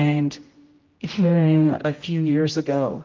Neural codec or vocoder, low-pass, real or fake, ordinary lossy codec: codec, 16 kHz, 0.5 kbps, X-Codec, HuBERT features, trained on general audio; 7.2 kHz; fake; Opus, 32 kbps